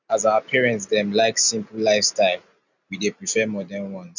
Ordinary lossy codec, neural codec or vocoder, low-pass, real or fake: none; none; 7.2 kHz; real